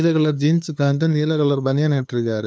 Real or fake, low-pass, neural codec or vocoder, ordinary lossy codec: fake; none; codec, 16 kHz, 2 kbps, FunCodec, trained on LibriTTS, 25 frames a second; none